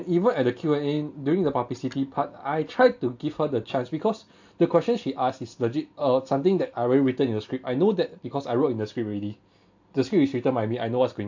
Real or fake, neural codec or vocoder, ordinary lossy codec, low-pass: real; none; AAC, 48 kbps; 7.2 kHz